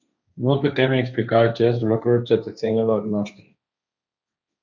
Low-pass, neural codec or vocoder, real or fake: 7.2 kHz; codec, 16 kHz, 1.1 kbps, Voila-Tokenizer; fake